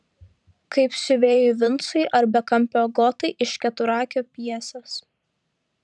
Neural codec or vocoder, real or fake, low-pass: vocoder, 44.1 kHz, 128 mel bands every 512 samples, BigVGAN v2; fake; 10.8 kHz